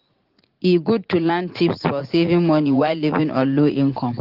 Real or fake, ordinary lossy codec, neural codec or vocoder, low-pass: fake; Opus, 16 kbps; vocoder, 44.1 kHz, 80 mel bands, Vocos; 5.4 kHz